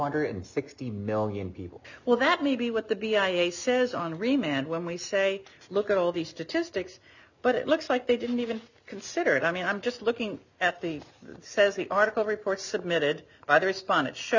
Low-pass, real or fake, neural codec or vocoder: 7.2 kHz; real; none